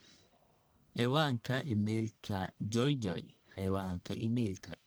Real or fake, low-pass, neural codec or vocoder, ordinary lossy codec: fake; none; codec, 44.1 kHz, 1.7 kbps, Pupu-Codec; none